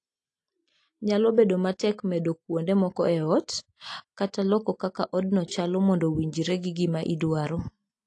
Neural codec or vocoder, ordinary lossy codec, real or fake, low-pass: none; AAC, 48 kbps; real; 10.8 kHz